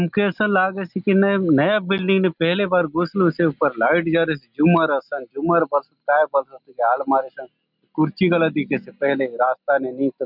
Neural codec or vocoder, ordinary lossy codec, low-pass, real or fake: none; none; 5.4 kHz; real